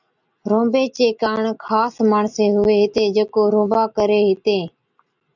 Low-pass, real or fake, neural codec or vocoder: 7.2 kHz; real; none